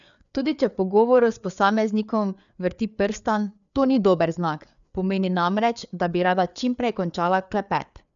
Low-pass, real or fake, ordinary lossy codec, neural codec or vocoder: 7.2 kHz; fake; none; codec, 16 kHz, 4 kbps, FreqCodec, larger model